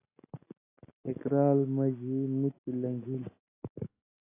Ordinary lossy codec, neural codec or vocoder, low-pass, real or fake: AAC, 16 kbps; none; 3.6 kHz; real